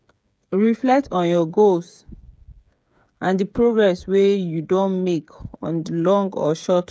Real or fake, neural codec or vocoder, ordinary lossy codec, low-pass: fake; codec, 16 kHz, 8 kbps, FreqCodec, smaller model; none; none